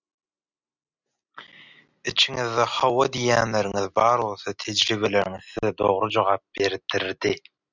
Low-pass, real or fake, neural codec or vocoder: 7.2 kHz; real; none